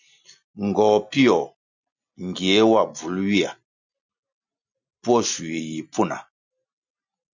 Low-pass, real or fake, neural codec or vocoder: 7.2 kHz; real; none